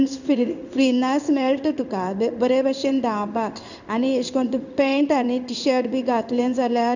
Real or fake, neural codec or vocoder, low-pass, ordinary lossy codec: fake; codec, 16 kHz in and 24 kHz out, 1 kbps, XY-Tokenizer; 7.2 kHz; none